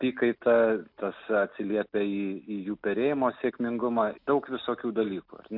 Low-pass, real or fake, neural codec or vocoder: 5.4 kHz; real; none